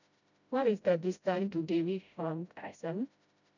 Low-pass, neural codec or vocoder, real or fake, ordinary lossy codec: 7.2 kHz; codec, 16 kHz, 0.5 kbps, FreqCodec, smaller model; fake; none